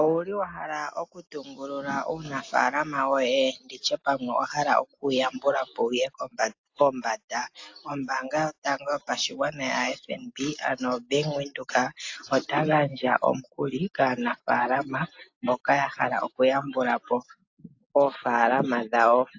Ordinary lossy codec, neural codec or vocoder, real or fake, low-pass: AAC, 48 kbps; none; real; 7.2 kHz